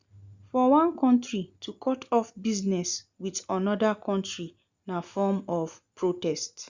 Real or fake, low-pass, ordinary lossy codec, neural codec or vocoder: real; 7.2 kHz; Opus, 64 kbps; none